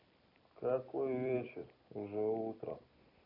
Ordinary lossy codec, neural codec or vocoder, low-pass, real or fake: none; vocoder, 44.1 kHz, 128 mel bands every 256 samples, BigVGAN v2; 5.4 kHz; fake